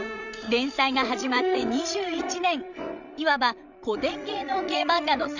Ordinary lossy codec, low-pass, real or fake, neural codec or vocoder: none; 7.2 kHz; fake; codec, 16 kHz, 16 kbps, FreqCodec, larger model